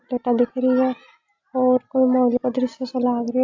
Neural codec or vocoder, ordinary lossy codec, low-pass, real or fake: none; AAC, 48 kbps; 7.2 kHz; real